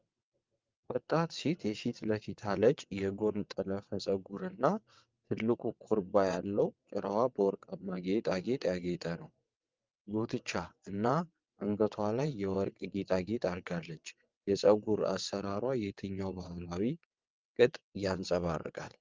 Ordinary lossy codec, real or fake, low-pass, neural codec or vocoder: Opus, 24 kbps; fake; 7.2 kHz; vocoder, 22.05 kHz, 80 mel bands, WaveNeXt